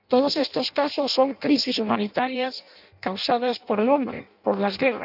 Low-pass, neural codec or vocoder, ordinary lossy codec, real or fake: 5.4 kHz; codec, 16 kHz in and 24 kHz out, 0.6 kbps, FireRedTTS-2 codec; none; fake